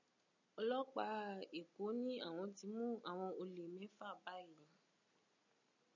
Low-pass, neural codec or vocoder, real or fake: 7.2 kHz; none; real